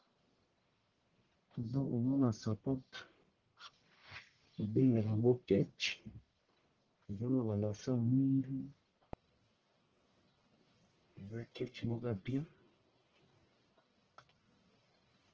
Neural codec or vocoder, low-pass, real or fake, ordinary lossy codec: codec, 44.1 kHz, 1.7 kbps, Pupu-Codec; 7.2 kHz; fake; Opus, 16 kbps